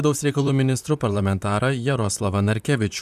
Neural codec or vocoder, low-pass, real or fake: vocoder, 44.1 kHz, 128 mel bands, Pupu-Vocoder; 14.4 kHz; fake